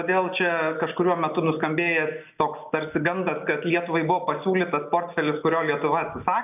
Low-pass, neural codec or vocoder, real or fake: 3.6 kHz; none; real